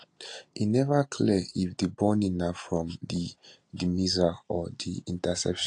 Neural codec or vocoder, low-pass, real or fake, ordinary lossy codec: none; 10.8 kHz; real; AAC, 48 kbps